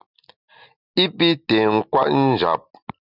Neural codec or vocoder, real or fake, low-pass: none; real; 5.4 kHz